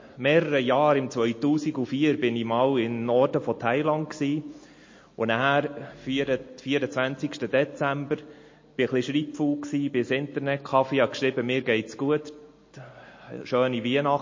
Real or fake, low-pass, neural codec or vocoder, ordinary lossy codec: real; 7.2 kHz; none; MP3, 32 kbps